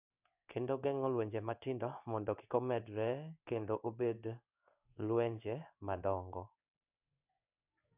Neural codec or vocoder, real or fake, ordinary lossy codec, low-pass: codec, 16 kHz in and 24 kHz out, 1 kbps, XY-Tokenizer; fake; none; 3.6 kHz